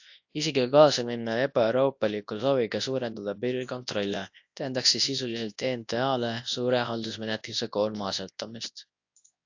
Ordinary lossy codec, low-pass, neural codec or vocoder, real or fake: AAC, 48 kbps; 7.2 kHz; codec, 24 kHz, 0.9 kbps, WavTokenizer, large speech release; fake